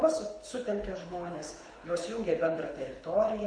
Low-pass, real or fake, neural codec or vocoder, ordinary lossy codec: 9.9 kHz; fake; codec, 24 kHz, 6 kbps, HILCodec; MP3, 48 kbps